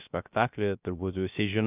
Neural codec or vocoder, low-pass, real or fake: codec, 16 kHz, 0.3 kbps, FocalCodec; 3.6 kHz; fake